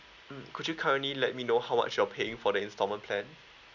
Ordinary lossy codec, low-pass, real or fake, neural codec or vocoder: none; 7.2 kHz; real; none